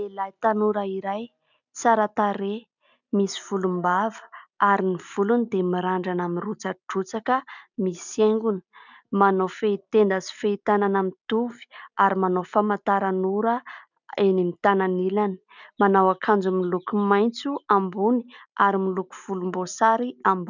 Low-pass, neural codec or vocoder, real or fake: 7.2 kHz; none; real